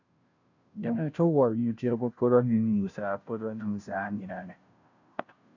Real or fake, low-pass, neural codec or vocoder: fake; 7.2 kHz; codec, 16 kHz, 0.5 kbps, FunCodec, trained on Chinese and English, 25 frames a second